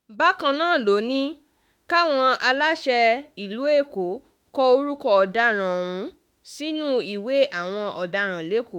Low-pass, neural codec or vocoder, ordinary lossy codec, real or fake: 19.8 kHz; autoencoder, 48 kHz, 32 numbers a frame, DAC-VAE, trained on Japanese speech; MP3, 96 kbps; fake